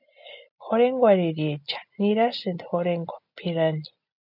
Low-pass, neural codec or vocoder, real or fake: 5.4 kHz; none; real